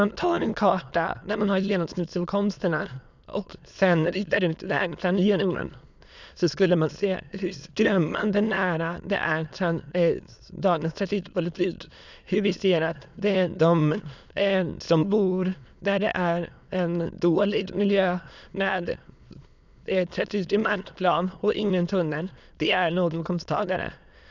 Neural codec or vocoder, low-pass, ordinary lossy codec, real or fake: autoencoder, 22.05 kHz, a latent of 192 numbers a frame, VITS, trained on many speakers; 7.2 kHz; none; fake